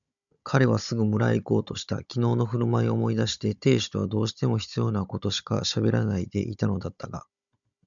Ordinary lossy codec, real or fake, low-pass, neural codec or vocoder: MP3, 96 kbps; fake; 7.2 kHz; codec, 16 kHz, 16 kbps, FunCodec, trained on Chinese and English, 50 frames a second